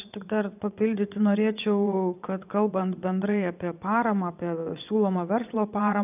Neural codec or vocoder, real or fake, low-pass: vocoder, 22.05 kHz, 80 mel bands, WaveNeXt; fake; 3.6 kHz